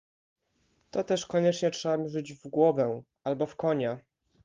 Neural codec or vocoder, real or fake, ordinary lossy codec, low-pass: none; real; Opus, 32 kbps; 7.2 kHz